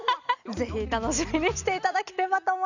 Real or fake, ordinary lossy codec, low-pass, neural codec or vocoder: real; none; 7.2 kHz; none